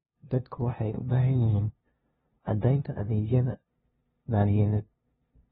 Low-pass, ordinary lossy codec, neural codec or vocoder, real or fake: 7.2 kHz; AAC, 16 kbps; codec, 16 kHz, 0.5 kbps, FunCodec, trained on LibriTTS, 25 frames a second; fake